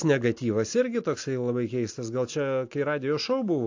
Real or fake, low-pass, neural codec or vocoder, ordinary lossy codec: real; 7.2 kHz; none; AAC, 48 kbps